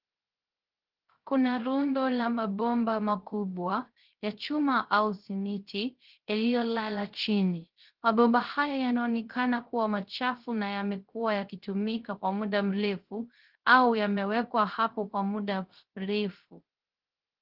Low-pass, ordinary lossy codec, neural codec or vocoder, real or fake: 5.4 kHz; Opus, 16 kbps; codec, 16 kHz, 0.3 kbps, FocalCodec; fake